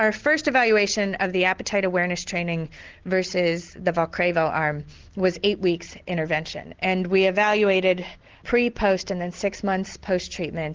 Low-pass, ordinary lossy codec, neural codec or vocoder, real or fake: 7.2 kHz; Opus, 16 kbps; none; real